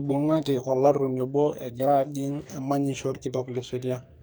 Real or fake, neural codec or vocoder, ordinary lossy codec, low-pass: fake; codec, 44.1 kHz, 2.6 kbps, SNAC; none; none